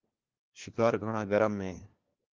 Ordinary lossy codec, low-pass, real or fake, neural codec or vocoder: Opus, 16 kbps; 7.2 kHz; fake; codec, 16 kHz, 1 kbps, FunCodec, trained on LibriTTS, 50 frames a second